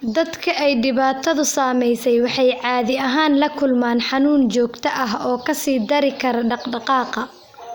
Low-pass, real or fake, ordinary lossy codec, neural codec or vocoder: none; real; none; none